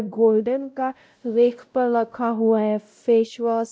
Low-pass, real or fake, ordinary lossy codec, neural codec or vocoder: none; fake; none; codec, 16 kHz, 0.5 kbps, X-Codec, WavLM features, trained on Multilingual LibriSpeech